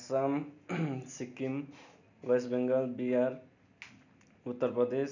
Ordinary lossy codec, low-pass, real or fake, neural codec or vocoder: none; 7.2 kHz; real; none